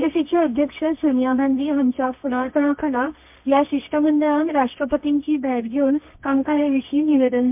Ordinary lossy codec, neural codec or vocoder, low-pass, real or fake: MP3, 32 kbps; codec, 24 kHz, 0.9 kbps, WavTokenizer, medium music audio release; 3.6 kHz; fake